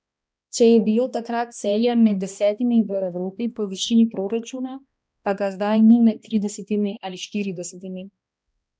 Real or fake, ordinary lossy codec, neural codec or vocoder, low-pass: fake; none; codec, 16 kHz, 1 kbps, X-Codec, HuBERT features, trained on balanced general audio; none